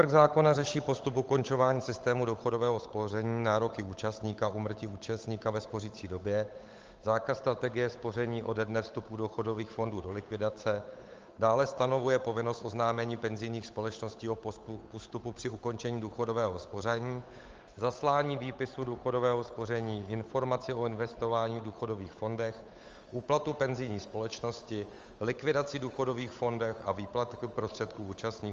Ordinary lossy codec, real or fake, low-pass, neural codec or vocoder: Opus, 32 kbps; fake; 7.2 kHz; codec, 16 kHz, 8 kbps, FunCodec, trained on Chinese and English, 25 frames a second